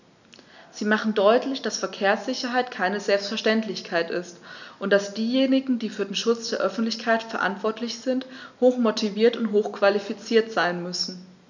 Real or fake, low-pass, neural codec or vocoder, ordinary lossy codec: real; 7.2 kHz; none; none